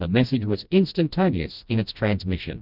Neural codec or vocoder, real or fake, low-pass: codec, 16 kHz, 1 kbps, FreqCodec, smaller model; fake; 5.4 kHz